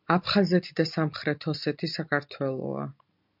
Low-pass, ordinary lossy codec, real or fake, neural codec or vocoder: 5.4 kHz; MP3, 48 kbps; real; none